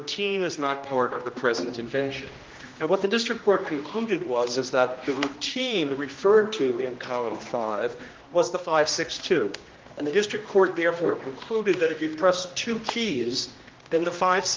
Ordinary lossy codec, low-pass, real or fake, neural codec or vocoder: Opus, 32 kbps; 7.2 kHz; fake; codec, 16 kHz, 1 kbps, X-Codec, HuBERT features, trained on general audio